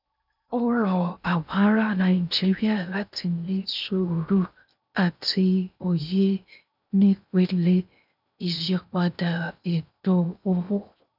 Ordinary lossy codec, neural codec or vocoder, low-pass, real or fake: none; codec, 16 kHz in and 24 kHz out, 0.6 kbps, FocalCodec, streaming, 2048 codes; 5.4 kHz; fake